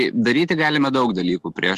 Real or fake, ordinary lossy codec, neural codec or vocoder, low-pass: fake; Opus, 24 kbps; autoencoder, 48 kHz, 128 numbers a frame, DAC-VAE, trained on Japanese speech; 14.4 kHz